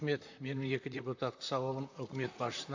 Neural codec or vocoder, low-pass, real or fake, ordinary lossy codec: vocoder, 44.1 kHz, 128 mel bands, Pupu-Vocoder; 7.2 kHz; fake; AAC, 48 kbps